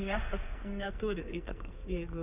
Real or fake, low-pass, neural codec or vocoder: fake; 3.6 kHz; codec, 44.1 kHz, 2.6 kbps, SNAC